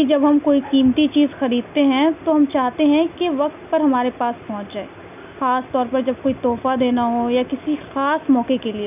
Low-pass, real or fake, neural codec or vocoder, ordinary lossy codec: 3.6 kHz; real; none; none